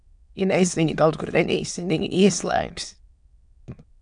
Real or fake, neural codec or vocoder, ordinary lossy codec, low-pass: fake; autoencoder, 22.05 kHz, a latent of 192 numbers a frame, VITS, trained on many speakers; MP3, 96 kbps; 9.9 kHz